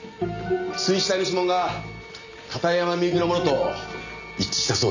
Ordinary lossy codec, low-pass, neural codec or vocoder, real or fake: none; 7.2 kHz; none; real